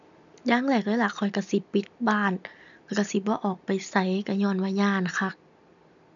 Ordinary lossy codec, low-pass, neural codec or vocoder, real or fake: none; 7.2 kHz; none; real